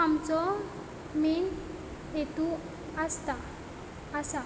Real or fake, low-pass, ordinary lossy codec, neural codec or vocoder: real; none; none; none